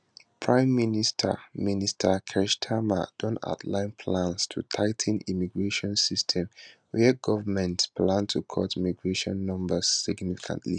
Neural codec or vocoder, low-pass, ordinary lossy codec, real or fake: none; 9.9 kHz; none; real